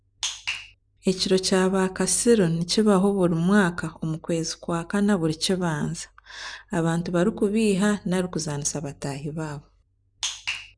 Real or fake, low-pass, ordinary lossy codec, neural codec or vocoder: real; 9.9 kHz; none; none